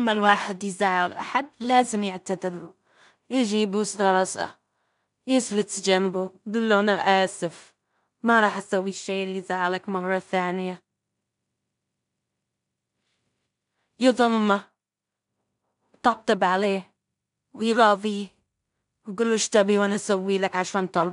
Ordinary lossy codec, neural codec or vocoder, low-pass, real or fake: none; codec, 16 kHz in and 24 kHz out, 0.4 kbps, LongCat-Audio-Codec, two codebook decoder; 10.8 kHz; fake